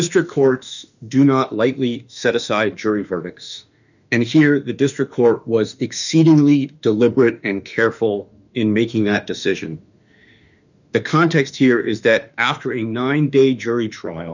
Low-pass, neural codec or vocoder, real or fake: 7.2 kHz; autoencoder, 48 kHz, 32 numbers a frame, DAC-VAE, trained on Japanese speech; fake